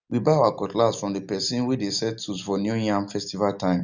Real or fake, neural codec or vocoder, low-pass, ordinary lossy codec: real; none; 7.2 kHz; none